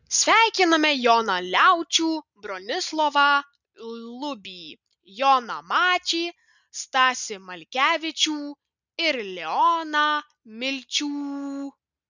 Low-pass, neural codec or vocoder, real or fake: 7.2 kHz; none; real